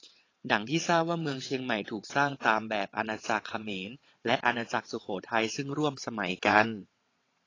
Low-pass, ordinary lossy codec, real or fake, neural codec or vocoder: 7.2 kHz; AAC, 32 kbps; fake; vocoder, 22.05 kHz, 80 mel bands, WaveNeXt